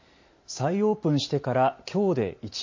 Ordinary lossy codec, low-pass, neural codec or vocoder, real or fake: MP3, 32 kbps; 7.2 kHz; none; real